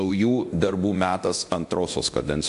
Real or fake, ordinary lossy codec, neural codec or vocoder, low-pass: fake; AAC, 64 kbps; codec, 24 kHz, 0.9 kbps, DualCodec; 10.8 kHz